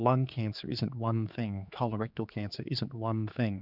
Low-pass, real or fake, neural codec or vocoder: 5.4 kHz; fake; codec, 16 kHz, 4 kbps, X-Codec, HuBERT features, trained on general audio